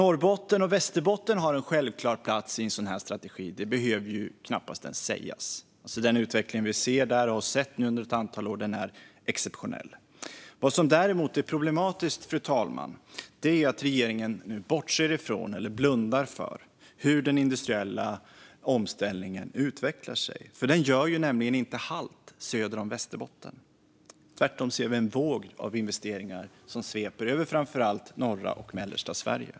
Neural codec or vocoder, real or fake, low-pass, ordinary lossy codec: none; real; none; none